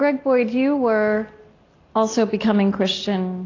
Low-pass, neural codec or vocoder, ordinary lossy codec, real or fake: 7.2 kHz; none; AAC, 32 kbps; real